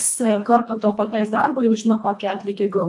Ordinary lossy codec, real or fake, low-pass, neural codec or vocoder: AAC, 64 kbps; fake; 10.8 kHz; codec, 24 kHz, 1.5 kbps, HILCodec